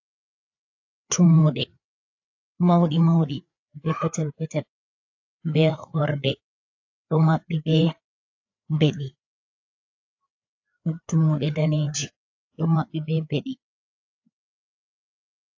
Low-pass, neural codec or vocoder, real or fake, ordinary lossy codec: 7.2 kHz; codec, 16 kHz, 4 kbps, FreqCodec, larger model; fake; AAC, 48 kbps